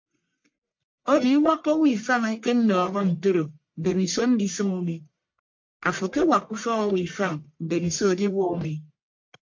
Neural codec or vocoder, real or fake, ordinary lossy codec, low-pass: codec, 44.1 kHz, 1.7 kbps, Pupu-Codec; fake; MP3, 48 kbps; 7.2 kHz